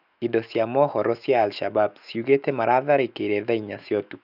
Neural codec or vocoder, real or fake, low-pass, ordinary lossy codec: autoencoder, 48 kHz, 128 numbers a frame, DAC-VAE, trained on Japanese speech; fake; 5.4 kHz; none